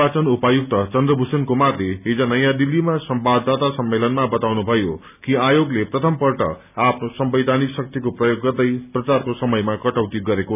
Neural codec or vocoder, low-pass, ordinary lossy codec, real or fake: none; 3.6 kHz; none; real